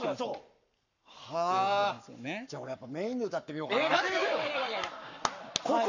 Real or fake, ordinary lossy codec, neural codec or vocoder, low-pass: fake; none; codec, 44.1 kHz, 7.8 kbps, Pupu-Codec; 7.2 kHz